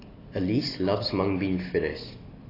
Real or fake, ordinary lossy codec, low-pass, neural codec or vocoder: real; AAC, 24 kbps; 5.4 kHz; none